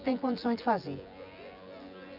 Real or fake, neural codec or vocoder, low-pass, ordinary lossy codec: fake; vocoder, 24 kHz, 100 mel bands, Vocos; 5.4 kHz; none